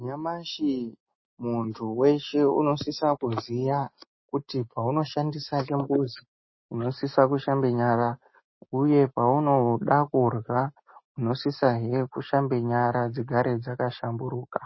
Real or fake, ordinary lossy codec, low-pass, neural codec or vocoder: real; MP3, 24 kbps; 7.2 kHz; none